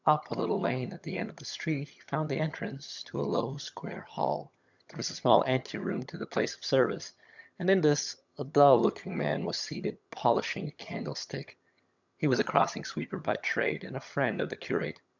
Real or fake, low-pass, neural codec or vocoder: fake; 7.2 kHz; vocoder, 22.05 kHz, 80 mel bands, HiFi-GAN